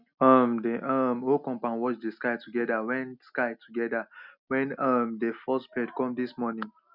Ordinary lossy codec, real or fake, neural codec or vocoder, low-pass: none; real; none; 5.4 kHz